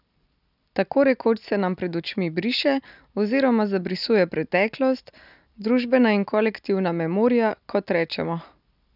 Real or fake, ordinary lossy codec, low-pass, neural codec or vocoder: real; none; 5.4 kHz; none